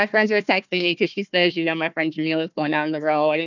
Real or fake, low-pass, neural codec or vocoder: fake; 7.2 kHz; codec, 16 kHz, 1 kbps, FunCodec, trained on Chinese and English, 50 frames a second